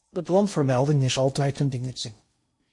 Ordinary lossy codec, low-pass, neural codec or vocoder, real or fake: MP3, 48 kbps; 10.8 kHz; codec, 16 kHz in and 24 kHz out, 0.6 kbps, FocalCodec, streaming, 2048 codes; fake